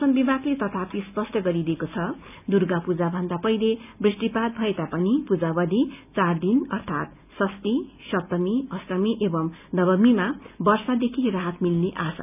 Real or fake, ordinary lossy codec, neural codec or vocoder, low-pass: real; none; none; 3.6 kHz